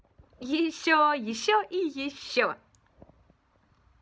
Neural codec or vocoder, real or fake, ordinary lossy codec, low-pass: none; real; none; none